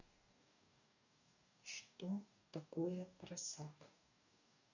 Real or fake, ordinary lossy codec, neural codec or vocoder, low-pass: fake; none; codec, 44.1 kHz, 2.6 kbps, DAC; 7.2 kHz